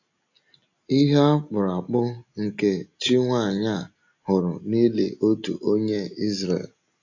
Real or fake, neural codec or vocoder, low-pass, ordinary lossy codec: real; none; 7.2 kHz; AAC, 48 kbps